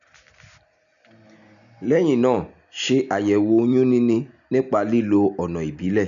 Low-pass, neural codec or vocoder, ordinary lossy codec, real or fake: 7.2 kHz; none; none; real